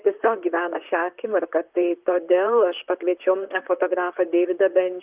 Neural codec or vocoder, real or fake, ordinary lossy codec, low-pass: vocoder, 44.1 kHz, 128 mel bands, Pupu-Vocoder; fake; Opus, 24 kbps; 3.6 kHz